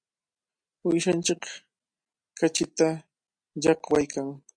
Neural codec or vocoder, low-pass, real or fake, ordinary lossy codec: none; 9.9 kHz; real; MP3, 64 kbps